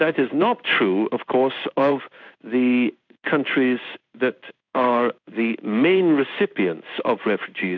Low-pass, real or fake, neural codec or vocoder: 7.2 kHz; fake; codec, 16 kHz in and 24 kHz out, 1 kbps, XY-Tokenizer